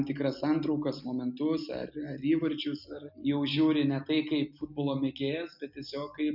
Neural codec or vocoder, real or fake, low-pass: none; real; 5.4 kHz